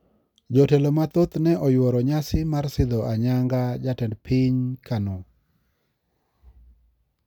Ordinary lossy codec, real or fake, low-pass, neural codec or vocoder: none; real; 19.8 kHz; none